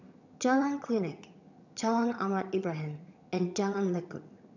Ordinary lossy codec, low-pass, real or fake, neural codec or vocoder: none; 7.2 kHz; fake; vocoder, 22.05 kHz, 80 mel bands, HiFi-GAN